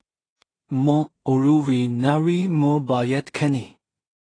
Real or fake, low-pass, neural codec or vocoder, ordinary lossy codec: fake; 9.9 kHz; codec, 16 kHz in and 24 kHz out, 0.4 kbps, LongCat-Audio-Codec, two codebook decoder; AAC, 32 kbps